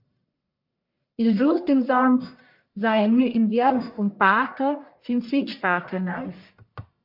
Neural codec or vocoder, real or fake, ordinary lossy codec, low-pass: codec, 44.1 kHz, 1.7 kbps, Pupu-Codec; fake; MP3, 48 kbps; 5.4 kHz